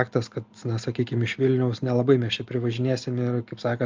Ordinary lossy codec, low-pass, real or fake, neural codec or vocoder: Opus, 32 kbps; 7.2 kHz; real; none